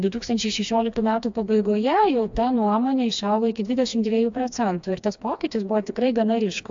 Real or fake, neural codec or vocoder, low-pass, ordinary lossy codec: fake; codec, 16 kHz, 2 kbps, FreqCodec, smaller model; 7.2 kHz; AAC, 64 kbps